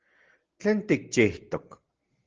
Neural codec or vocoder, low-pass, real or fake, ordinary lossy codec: none; 7.2 kHz; real; Opus, 16 kbps